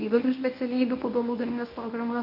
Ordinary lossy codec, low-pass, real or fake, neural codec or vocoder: AAC, 48 kbps; 5.4 kHz; fake; codec, 24 kHz, 0.9 kbps, WavTokenizer, medium speech release version 1